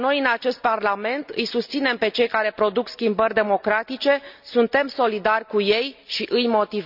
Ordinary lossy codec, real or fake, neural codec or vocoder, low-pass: none; real; none; 5.4 kHz